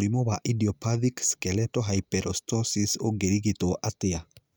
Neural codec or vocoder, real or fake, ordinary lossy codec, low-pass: none; real; none; none